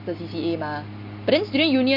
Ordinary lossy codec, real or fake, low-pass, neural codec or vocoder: none; real; 5.4 kHz; none